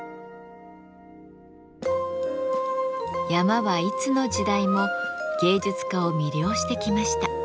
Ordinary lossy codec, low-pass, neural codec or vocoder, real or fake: none; none; none; real